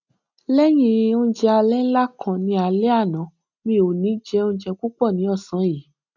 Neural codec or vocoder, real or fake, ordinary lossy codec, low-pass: none; real; none; 7.2 kHz